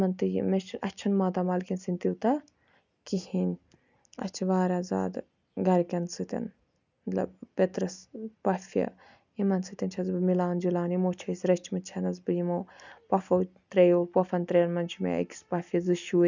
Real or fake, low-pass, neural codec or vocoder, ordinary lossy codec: real; 7.2 kHz; none; none